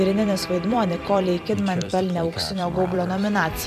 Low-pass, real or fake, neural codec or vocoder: 14.4 kHz; fake; vocoder, 44.1 kHz, 128 mel bands every 256 samples, BigVGAN v2